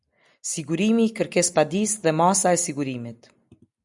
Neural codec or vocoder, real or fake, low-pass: none; real; 10.8 kHz